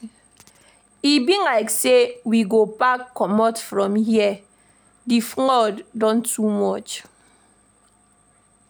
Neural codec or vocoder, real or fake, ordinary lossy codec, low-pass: none; real; none; none